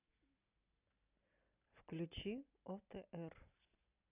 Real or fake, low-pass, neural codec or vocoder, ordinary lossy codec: real; 3.6 kHz; none; none